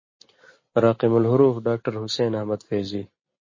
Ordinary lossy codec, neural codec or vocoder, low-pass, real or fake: MP3, 32 kbps; none; 7.2 kHz; real